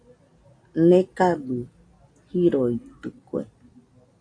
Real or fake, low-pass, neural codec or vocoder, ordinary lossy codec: real; 9.9 kHz; none; AAC, 48 kbps